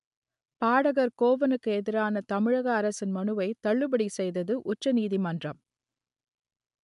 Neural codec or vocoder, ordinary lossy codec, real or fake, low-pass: none; MP3, 96 kbps; real; 10.8 kHz